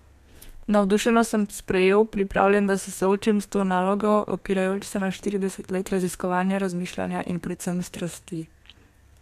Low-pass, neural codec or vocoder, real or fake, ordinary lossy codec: 14.4 kHz; codec, 32 kHz, 1.9 kbps, SNAC; fake; none